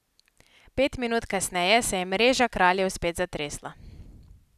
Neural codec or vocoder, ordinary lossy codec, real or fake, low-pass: none; none; real; 14.4 kHz